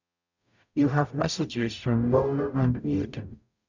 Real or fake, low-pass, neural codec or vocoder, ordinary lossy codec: fake; 7.2 kHz; codec, 44.1 kHz, 0.9 kbps, DAC; none